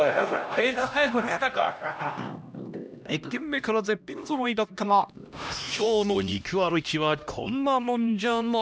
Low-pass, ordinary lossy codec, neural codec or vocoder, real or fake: none; none; codec, 16 kHz, 1 kbps, X-Codec, HuBERT features, trained on LibriSpeech; fake